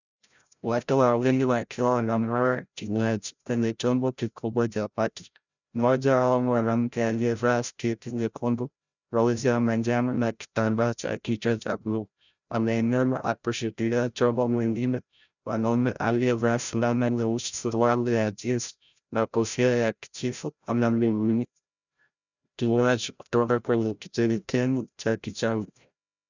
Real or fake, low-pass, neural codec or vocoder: fake; 7.2 kHz; codec, 16 kHz, 0.5 kbps, FreqCodec, larger model